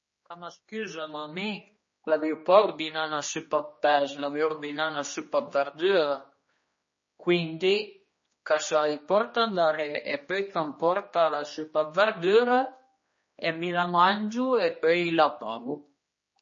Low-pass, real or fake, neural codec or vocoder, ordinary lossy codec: 7.2 kHz; fake; codec, 16 kHz, 2 kbps, X-Codec, HuBERT features, trained on general audio; MP3, 32 kbps